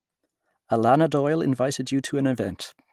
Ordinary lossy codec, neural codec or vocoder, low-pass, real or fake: Opus, 24 kbps; none; 14.4 kHz; real